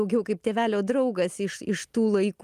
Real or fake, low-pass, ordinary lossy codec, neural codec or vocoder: real; 14.4 kHz; Opus, 32 kbps; none